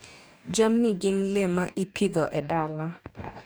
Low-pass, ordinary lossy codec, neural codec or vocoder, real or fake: none; none; codec, 44.1 kHz, 2.6 kbps, DAC; fake